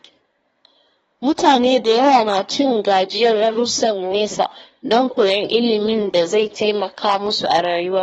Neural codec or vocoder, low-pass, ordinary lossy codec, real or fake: codec, 24 kHz, 1 kbps, SNAC; 10.8 kHz; AAC, 24 kbps; fake